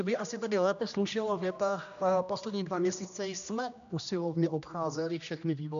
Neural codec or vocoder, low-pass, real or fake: codec, 16 kHz, 1 kbps, X-Codec, HuBERT features, trained on general audio; 7.2 kHz; fake